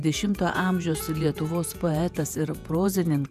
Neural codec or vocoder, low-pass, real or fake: vocoder, 48 kHz, 128 mel bands, Vocos; 14.4 kHz; fake